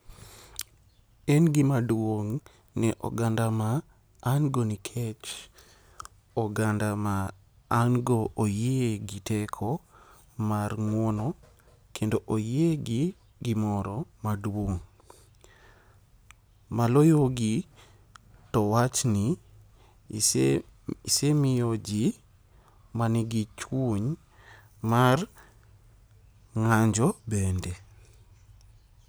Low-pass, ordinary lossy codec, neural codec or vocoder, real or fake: none; none; none; real